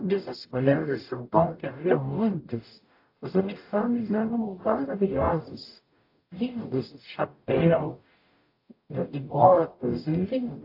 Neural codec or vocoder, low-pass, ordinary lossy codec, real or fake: codec, 44.1 kHz, 0.9 kbps, DAC; 5.4 kHz; AAC, 32 kbps; fake